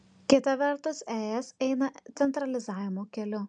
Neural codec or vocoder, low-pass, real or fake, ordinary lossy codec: none; 9.9 kHz; real; AAC, 64 kbps